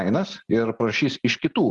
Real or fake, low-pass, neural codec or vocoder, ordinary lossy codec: real; 10.8 kHz; none; Opus, 24 kbps